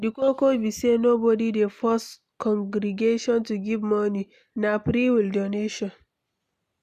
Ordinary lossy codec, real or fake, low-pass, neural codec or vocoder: none; real; 14.4 kHz; none